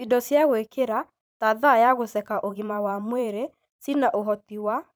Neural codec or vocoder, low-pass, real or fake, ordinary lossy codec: vocoder, 44.1 kHz, 128 mel bands every 512 samples, BigVGAN v2; none; fake; none